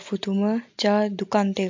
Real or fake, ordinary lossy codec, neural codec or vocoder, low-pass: real; MP3, 48 kbps; none; 7.2 kHz